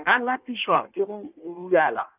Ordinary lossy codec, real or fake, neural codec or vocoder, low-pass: none; fake; codec, 16 kHz in and 24 kHz out, 1.1 kbps, FireRedTTS-2 codec; 3.6 kHz